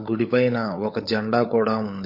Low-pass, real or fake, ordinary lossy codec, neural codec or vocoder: 5.4 kHz; fake; MP3, 32 kbps; codec, 16 kHz, 16 kbps, FunCodec, trained on LibriTTS, 50 frames a second